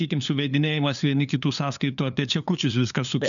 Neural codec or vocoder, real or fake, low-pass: codec, 16 kHz, 2 kbps, FunCodec, trained on LibriTTS, 25 frames a second; fake; 7.2 kHz